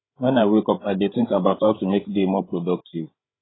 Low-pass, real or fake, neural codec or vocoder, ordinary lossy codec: 7.2 kHz; fake; codec, 16 kHz, 16 kbps, FreqCodec, larger model; AAC, 16 kbps